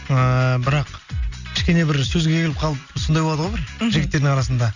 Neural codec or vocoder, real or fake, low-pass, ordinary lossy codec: none; real; 7.2 kHz; none